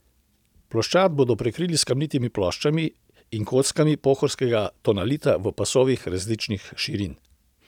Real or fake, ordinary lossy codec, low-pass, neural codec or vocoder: real; none; 19.8 kHz; none